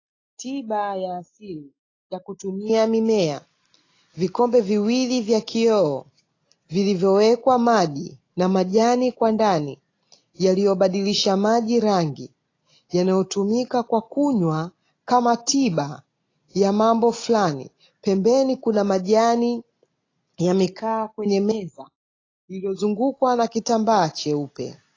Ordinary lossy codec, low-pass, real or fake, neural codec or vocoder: AAC, 32 kbps; 7.2 kHz; real; none